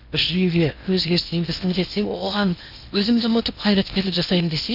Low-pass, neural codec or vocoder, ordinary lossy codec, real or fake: 5.4 kHz; codec, 16 kHz in and 24 kHz out, 0.6 kbps, FocalCodec, streaming, 2048 codes; none; fake